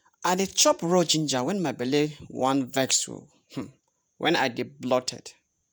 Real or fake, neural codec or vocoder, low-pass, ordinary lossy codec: fake; vocoder, 48 kHz, 128 mel bands, Vocos; none; none